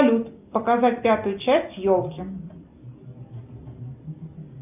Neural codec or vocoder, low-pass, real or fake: none; 3.6 kHz; real